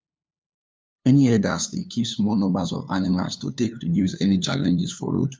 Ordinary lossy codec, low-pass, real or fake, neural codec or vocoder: none; none; fake; codec, 16 kHz, 2 kbps, FunCodec, trained on LibriTTS, 25 frames a second